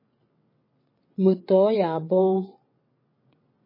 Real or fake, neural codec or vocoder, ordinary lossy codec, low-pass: fake; vocoder, 22.05 kHz, 80 mel bands, WaveNeXt; MP3, 24 kbps; 5.4 kHz